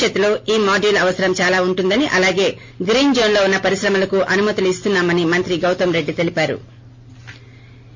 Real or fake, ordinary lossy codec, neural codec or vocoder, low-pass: real; MP3, 48 kbps; none; 7.2 kHz